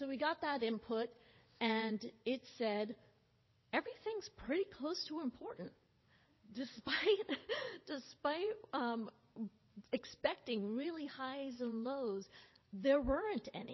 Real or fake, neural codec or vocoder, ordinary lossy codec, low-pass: fake; vocoder, 22.05 kHz, 80 mel bands, WaveNeXt; MP3, 24 kbps; 7.2 kHz